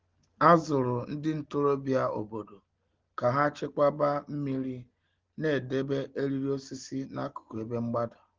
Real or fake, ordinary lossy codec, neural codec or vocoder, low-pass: real; Opus, 16 kbps; none; 7.2 kHz